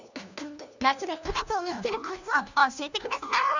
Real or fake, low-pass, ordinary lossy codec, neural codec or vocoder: fake; 7.2 kHz; none; codec, 16 kHz, 1 kbps, FunCodec, trained on LibriTTS, 50 frames a second